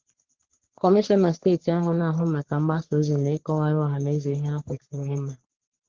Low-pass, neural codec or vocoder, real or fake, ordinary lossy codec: 7.2 kHz; codec, 44.1 kHz, 3.4 kbps, Pupu-Codec; fake; Opus, 16 kbps